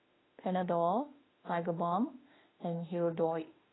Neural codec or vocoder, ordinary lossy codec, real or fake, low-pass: autoencoder, 48 kHz, 32 numbers a frame, DAC-VAE, trained on Japanese speech; AAC, 16 kbps; fake; 7.2 kHz